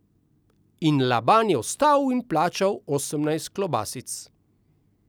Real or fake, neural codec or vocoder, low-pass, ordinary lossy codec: real; none; none; none